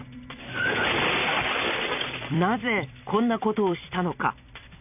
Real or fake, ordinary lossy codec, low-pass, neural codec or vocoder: fake; none; 3.6 kHz; vocoder, 44.1 kHz, 128 mel bands every 256 samples, BigVGAN v2